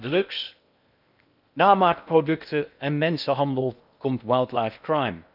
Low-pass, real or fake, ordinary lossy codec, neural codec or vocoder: 5.4 kHz; fake; none; codec, 16 kHz in and 24 kHz out, 0.8 kbps, FocalCodec, streaming, 65536 codes